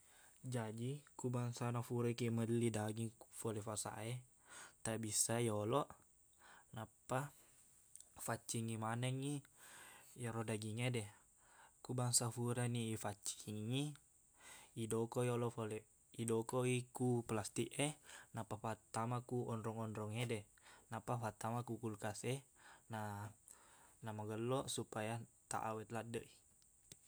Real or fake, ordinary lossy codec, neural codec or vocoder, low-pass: real; none; none; none